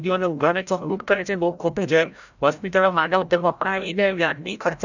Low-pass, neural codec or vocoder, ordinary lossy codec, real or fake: 7.2 kHz; codec, 16 kHz, 0.5 kbps, FreqCodec, larger model; none; fake